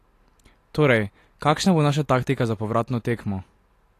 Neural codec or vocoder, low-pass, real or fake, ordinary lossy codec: none; 14.4 kHz; real; AAC, 48 kbps